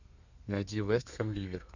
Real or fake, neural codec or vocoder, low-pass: fake; codec, 32 kHz, 1.9 kbps, SNAC; 7.2 kHz